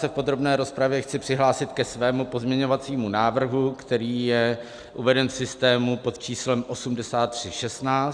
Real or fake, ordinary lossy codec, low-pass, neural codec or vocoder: real; Opus, 64 kbps; 9.9 kHz; none